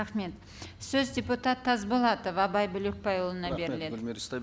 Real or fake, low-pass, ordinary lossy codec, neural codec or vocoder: real; none; none; none